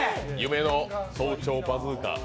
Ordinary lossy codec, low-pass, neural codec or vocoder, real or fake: none; none; none; real